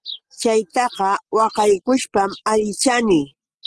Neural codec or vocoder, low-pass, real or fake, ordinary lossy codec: none; 10.8 kHz; real; Opus, 16 kbps